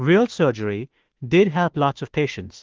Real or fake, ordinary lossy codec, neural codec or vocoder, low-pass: fake; Opus, 16 kbps; autoencoder, 48 kHz, 32 numbers a frame, DAC-VAE, trained on Japanese speech; 7.2 kHz